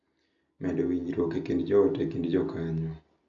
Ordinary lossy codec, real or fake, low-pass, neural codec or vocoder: none; real; 7.2 kHz; none